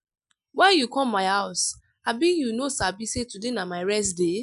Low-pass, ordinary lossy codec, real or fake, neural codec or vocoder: 10.8 kHz; none; real; none